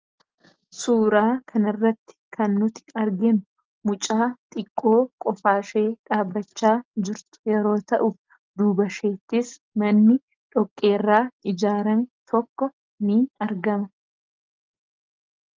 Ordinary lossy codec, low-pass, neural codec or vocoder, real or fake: Opus, 24 kbps; 7.2 kHz; none; real